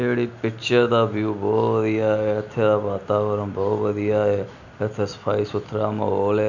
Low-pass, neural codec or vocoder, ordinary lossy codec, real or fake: 7.2 kHz; none; none; real